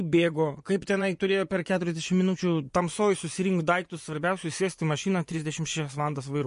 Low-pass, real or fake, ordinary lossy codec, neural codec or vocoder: 10.8 kHz; fake; MP3, 48 kbps; vocoder, 24 kHz, 100 mel bands, Vocos